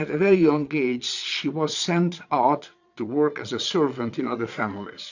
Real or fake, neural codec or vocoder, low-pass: fake; vocoder, 22.05 kHz, 80 mel bands, Vocos; 7.2 kHz